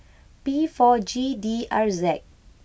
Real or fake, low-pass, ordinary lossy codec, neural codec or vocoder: real; none; none; none